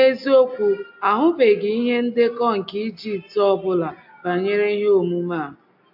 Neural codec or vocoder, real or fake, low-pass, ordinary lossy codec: none; real; 5.4 kHz; AAC, 48 kbps